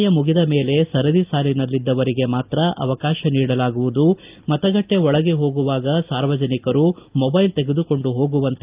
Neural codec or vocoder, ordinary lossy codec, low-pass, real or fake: none; Opus, 24 kbps; 3.6 kHz; real